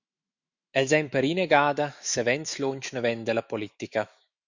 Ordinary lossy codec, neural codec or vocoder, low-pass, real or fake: Opus, 64 kbps; autoencoder, 48 kHz, 128 numbers a frame, DAC-VAE, trained on Japanese speech; 7.2 kHz; fake